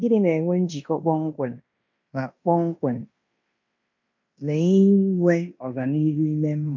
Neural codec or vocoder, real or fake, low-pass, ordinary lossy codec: codec, 16 kHz in and 24 kHz out, 0.9 kbps, LongCat-Audio-Codec, fine tuned four codebook decoder; fake; 7.2 kHz; MP3, 48 kbps